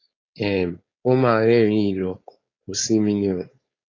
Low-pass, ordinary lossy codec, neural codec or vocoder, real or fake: 7.2 kHz; AAC, 32 kbps; codec, 16 kHz, 4.8 kbps, FACodec; fake